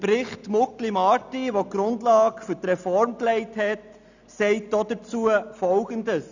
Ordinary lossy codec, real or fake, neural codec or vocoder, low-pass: none; real; none; 7.2 kHz